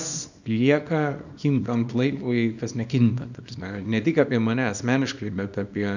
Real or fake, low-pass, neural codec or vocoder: fake; 7.2 kHz; codec, 24 kHz, 0.9 kbps, WavTokenizer, small release